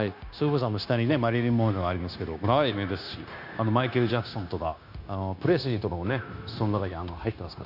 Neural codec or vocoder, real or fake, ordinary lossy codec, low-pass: codec, 16 kHz, 0.9 kbps, LongCat-Audio-Codec; fake; none; 5.4 kHz